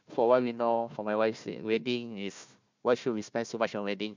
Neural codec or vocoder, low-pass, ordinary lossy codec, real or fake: codec, 16 kHz, 1 kbps, FunCodec, trained on Chinese and English, 50 frames a second; 7.2 kHz; MP3, 64 kbps; fake